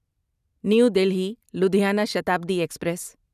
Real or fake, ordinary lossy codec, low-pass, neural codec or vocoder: real; none; 14.4 kHz; none